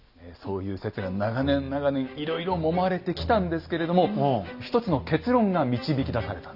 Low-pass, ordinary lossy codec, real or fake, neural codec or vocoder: 5.4 kHz; none; real; none